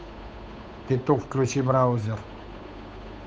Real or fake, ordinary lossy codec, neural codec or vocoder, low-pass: fake; none; codec, 16 kHz, 8 kbps, FunCodec, trained on Chinese and English, 25 frames a second; none